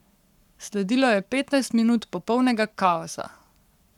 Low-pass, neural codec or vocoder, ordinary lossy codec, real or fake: 19.8 kHz; codec, 44.1 kHz, 7.8 kbps, Pupu-Codec; none; fake